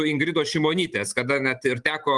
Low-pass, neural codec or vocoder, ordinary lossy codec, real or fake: 10.8 kHz; vocoder, 44.1 kHz, 128 mel bands every 512 samples, BigVGAN v2; Opus, 24 kbps; fake